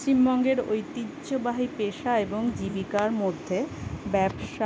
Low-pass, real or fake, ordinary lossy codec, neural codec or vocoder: none; real; none; none